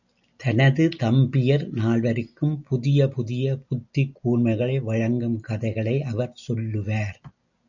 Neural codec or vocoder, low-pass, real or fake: none; 7.2 kHz; real